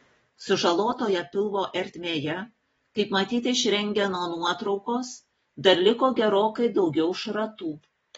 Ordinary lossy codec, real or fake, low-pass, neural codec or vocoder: AAC, 24 kbps; real; 19.8 kHz; none